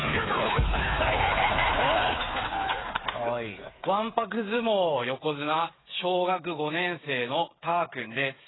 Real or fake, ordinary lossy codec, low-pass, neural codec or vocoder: fake; AAC, 16 kbps; 7.2 kHz; codec, 16 kHz, 8 kbps, FreqCodec, smaller model